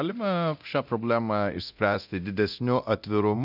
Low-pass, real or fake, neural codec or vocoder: 5.4 kHz; fake; codec, 24 kHz, 0.9 kbps, DualCodec